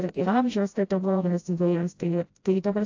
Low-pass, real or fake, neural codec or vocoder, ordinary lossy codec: 7.2 kHz; fake; codec, 16 kHz, 0.5 kbps, FreqCodec, smaller model; AAC, 48 kbps